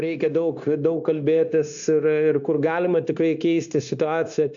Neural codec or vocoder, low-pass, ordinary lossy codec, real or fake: codec, 16 kHz, 0.9 kbps, LongCat-Audio-Codec; 7.2 kHz; AAC, 64 kbps; fake